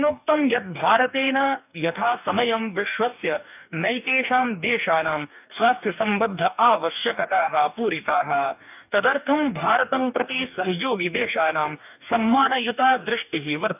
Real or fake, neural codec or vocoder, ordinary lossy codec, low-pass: fake; codec, 44.1 kHz, 2.6 kbps, DAC; none; 3.6 kHz